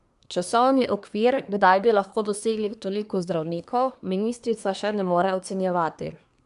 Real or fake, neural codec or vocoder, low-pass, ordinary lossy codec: fake; codec, 24 kHz, 1 kbps, SNAC; 10.8 kHz; none